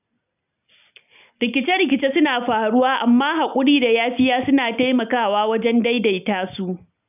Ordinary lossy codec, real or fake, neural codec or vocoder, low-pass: none; real; none; 3.6 kHz